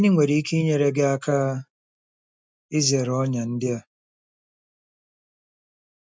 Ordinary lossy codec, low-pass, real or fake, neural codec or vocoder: none; none; real; none